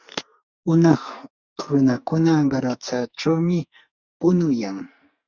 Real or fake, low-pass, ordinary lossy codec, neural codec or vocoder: fake; 7.2 kHz; Opus, 64 kbps; codec, 44.1 kHz, 2.6 kbps, SNAC